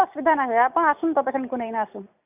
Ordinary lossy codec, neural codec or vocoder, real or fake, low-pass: none; none; real; 3.6 kHz